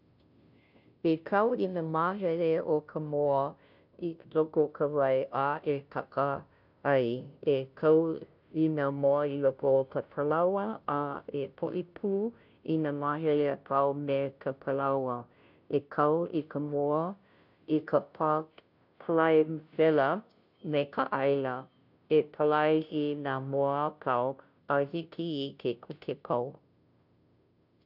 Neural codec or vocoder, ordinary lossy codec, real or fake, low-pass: codec, 16 kHz, 0.5 kbps, FunCodec, trained on Chinese and English, 25 frames a second; none; fake; 5.4 kHz